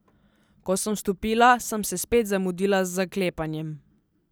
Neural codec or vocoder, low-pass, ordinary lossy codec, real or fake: none; none; none; real